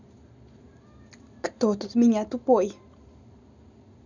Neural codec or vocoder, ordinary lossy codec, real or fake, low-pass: none; none; real; 7.2 kHz